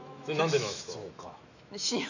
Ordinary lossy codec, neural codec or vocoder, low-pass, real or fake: none; autoencoder, 48 kHz, 128 numbers a frame, DAC-VAE, trained on Japanese speech; 7.2 kHz; fake